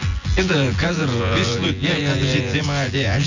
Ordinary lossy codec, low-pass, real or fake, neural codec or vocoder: none; 7.2 kHz; fake; vocoder, 24 kHz, 100 mel bands, Vocos